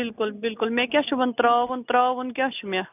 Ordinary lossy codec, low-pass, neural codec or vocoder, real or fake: none; 3.6 kHz; none; real